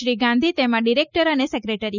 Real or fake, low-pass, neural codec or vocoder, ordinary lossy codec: real; 7.2 kHz; none; none